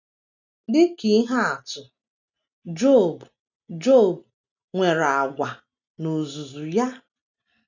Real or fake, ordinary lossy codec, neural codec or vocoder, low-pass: real; none; none; 7.2 kHz